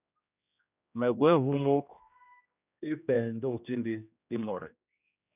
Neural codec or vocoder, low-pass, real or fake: codec, 16 kHz, 1 kbps, X-Codec, HuBERT features, trained on general audio; 3.6 kHz; fake